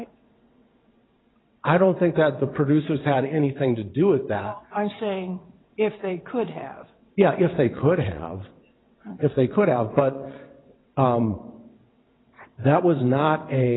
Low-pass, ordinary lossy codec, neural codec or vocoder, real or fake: 7.2 kHz; AAC, 16 kbps; vocoder, 22.05 kHz, 80 mel bands, WaveNeXt; fake